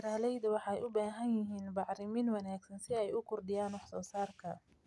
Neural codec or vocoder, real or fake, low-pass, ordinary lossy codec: none; real; none; none